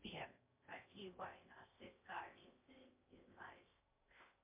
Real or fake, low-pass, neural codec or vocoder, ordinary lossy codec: fake; 3.6 kHz; codec, 16 kHz in and 24 kHz out, 0.6 kbps, FocalCodec, streaming, 4096 codes; MP3, 24 kbps